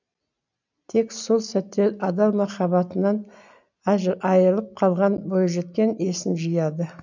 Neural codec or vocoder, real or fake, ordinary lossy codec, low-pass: none; real; none; 7.2 kHz